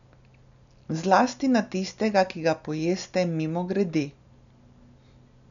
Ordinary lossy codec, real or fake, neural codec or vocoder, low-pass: none; real; none; 7.2 kHz